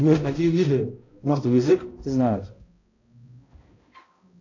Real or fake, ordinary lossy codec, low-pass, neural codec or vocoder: fake; AAC, 48 kbps; 7.2 kHz; codec, 16 kHz, 0.5 kbps, X-Codec, HuBERT features, trained on balanced general audio